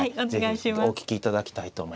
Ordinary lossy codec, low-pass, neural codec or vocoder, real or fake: none; none; none; real